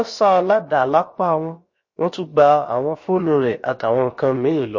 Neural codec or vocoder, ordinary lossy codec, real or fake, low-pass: codec, 16 kHz, about 1 kbps, DyCAST, with the encoder's durations; MP3, 32 kbps; fake; 7.2 kHz